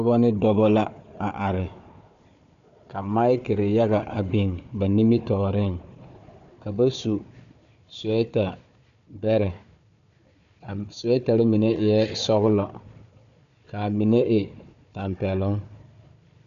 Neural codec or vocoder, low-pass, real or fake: codec, 16 kHz, 4 kbps, FunCodec, trained on Chinese and English, 50 frames a second; 7.2 kHz; fake